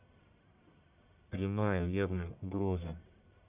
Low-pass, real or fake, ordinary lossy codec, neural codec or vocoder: 3.6 kHz; fake; none; codec, 44.1 kHz, 1.7 kbps, Pupu-Codec